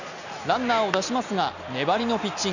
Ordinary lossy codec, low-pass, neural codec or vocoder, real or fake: none; 7.2 kHz; none; real